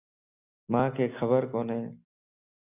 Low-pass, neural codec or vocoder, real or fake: 3.6 kHz; none; real